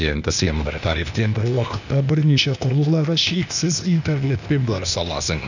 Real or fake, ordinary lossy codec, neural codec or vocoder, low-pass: fake; none; codec, 16 kHz, 0.8 kbps, ZipCodec; 7.2 kHz